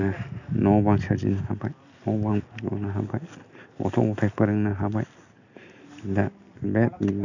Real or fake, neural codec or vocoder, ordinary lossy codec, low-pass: real; none; none; 7.2 kHz